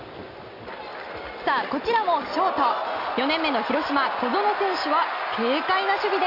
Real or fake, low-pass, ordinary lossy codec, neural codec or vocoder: real; 5.4 kHz; none; none